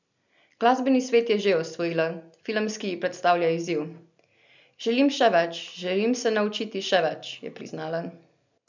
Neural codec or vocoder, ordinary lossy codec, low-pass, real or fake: none; none; 7.2 kHz; real